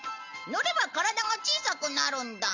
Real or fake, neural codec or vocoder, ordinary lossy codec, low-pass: real; none; none; 7.2 kHz